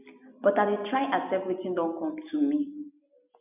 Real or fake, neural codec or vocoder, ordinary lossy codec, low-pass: real; none; none; 3.6 kHz